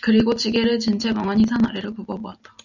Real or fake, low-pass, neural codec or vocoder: real; 7.2 kHz; none